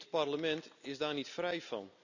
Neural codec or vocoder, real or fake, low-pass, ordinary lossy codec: none; real; 7.2 kHz; none